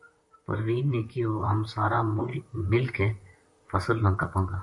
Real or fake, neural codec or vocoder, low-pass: fake; vocoder, 44.1 kHz, 128 mel bands, Pupu-Vocoder; 10.8 kHz